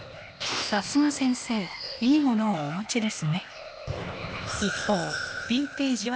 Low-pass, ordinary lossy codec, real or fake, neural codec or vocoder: none; none; fake; codec, 16 kHz, 0.8 kbps, ZipCodec